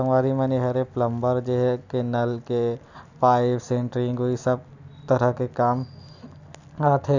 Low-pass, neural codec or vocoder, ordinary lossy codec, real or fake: 7.2 kHz; none; none; real